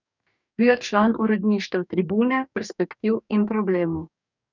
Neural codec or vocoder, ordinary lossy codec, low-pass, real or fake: codec, 44.1 kHz, 2.6 kbps, DAC; none; 7.2 kHz; fake